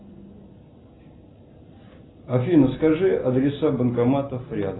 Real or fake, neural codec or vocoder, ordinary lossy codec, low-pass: real; none; AAC, 16 kbps; 7.2 kHz